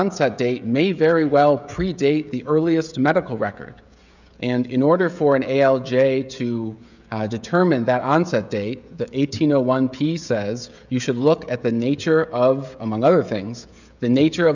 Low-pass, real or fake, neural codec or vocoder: 7.2 kHz; fake; codec, 16 kHz, 16 kbps, FreqCodec, smaller model